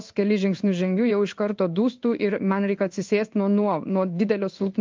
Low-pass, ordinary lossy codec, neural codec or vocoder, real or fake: 7.2 kHz; Opus, 24 kbps; codec, 16 kHz in and 24 kHz out, 1 kbps, XY-Tokenizer; fake